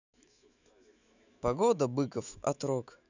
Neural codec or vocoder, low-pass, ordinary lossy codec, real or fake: autoencoder, 48 kHz, 128 numbers a frame, DAC-VAE, trained on Japanese speech; 7.2 kHz; none; fake